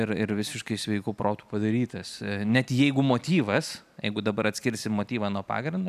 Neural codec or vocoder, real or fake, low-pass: none; real; 14.4 kHz